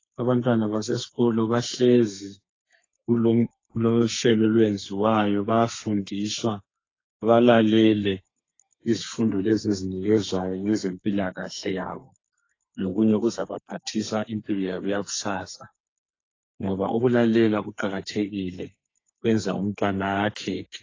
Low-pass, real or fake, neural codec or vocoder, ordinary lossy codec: 7.2 kHz; fake; codec, 32 kHz, 1.9 kbps, SNAC; AAC, 32 kbps